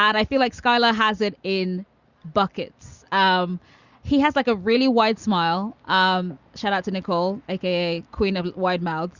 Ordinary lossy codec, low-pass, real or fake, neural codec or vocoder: Opus, 64 kbps; 7.2 kHz; real; none